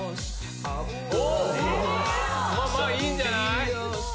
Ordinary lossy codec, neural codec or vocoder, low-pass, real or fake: none; none; none; real